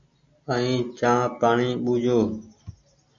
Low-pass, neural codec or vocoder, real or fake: 7.2 kHz; none; real